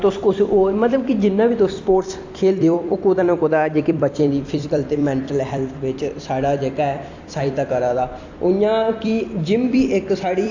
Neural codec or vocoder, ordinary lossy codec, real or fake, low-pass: vocoder, 44.1 kHz, 128 mel bands every 256 samples, BigVGAN v2; AAC, 48 kbps; fake; 7.2 kHz